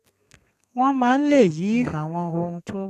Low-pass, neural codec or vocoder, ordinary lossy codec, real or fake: 14.4 kHz; codec, 32 kHz, 1.9 kbps, SNAC; none; fake